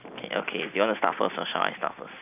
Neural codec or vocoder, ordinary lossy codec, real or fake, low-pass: none; none; real; 3.6 kHz